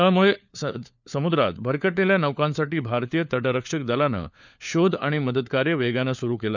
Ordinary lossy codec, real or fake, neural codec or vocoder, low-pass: none; fake; codec, 16 kHz, 4 kbps, FunCodec, trained on LibriTTS, 50 frames a second; 7.2 kHz